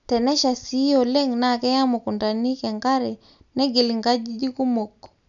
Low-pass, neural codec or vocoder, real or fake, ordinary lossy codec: 7.2 kHz; none; real; none